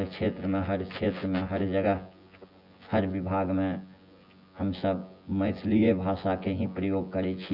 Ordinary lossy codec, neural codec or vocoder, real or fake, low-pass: none; vocoder, 24 kHz, 100 mel bands, Vocos; fake; 5.4 kHz